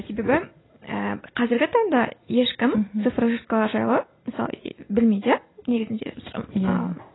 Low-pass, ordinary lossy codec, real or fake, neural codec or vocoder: 7.2 kHz; AAC, 16 kbps; real; none